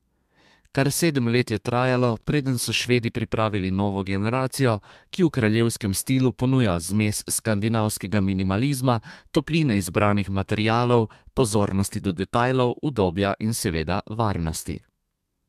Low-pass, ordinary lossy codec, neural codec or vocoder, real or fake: 14.4 kHz; MP3, 96 kbps; codec, 32 kHz, 1.9 kbps, SNAC; fake